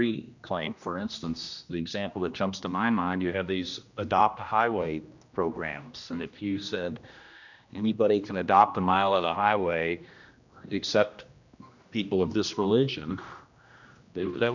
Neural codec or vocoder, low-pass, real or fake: codec, 16 kHz, 1 kbps, X-Codec, HuBERT features, trained on general audio; 7.2 kHz; fake